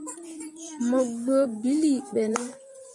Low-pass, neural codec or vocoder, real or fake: 10.8 kHz; vocoder, 24 kHz, 100 mel bands, Vocos; fake